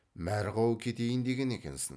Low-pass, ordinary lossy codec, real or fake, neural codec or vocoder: none; none; real; none